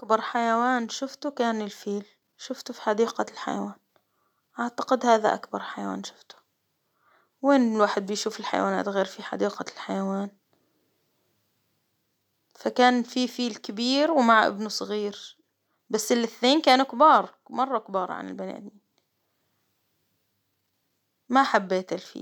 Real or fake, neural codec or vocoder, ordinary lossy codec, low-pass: real; none; none; 19.8 kHz